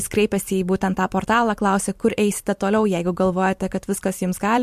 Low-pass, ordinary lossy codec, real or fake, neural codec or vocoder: 14.4 kHz; MP3, 64 kbps; fake; vocoder, 44.1 kHz, 128 mel bands every 256 samples, BigVGAN v2